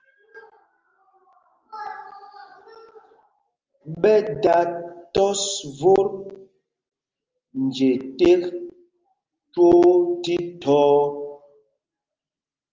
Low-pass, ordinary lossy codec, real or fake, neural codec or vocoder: 7.2 kHz; Opus, 32 kbps; real; none